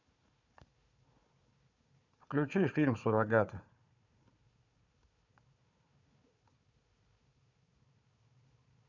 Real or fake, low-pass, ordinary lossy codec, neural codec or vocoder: fake; 7.2 kHz; none; codec, 16 kHz, 4 kbps, FunCodec, trained on Chinese and English, 50 frames a second